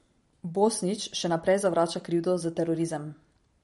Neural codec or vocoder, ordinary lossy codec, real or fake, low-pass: vocoder, 44.1 kHz, 128 mel bands every 512 samples, BigVGAN v2; MP3, 48 kbps; fake; 19.8 kHz